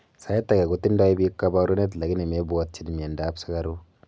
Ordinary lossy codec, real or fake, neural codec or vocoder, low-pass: none; real; none; none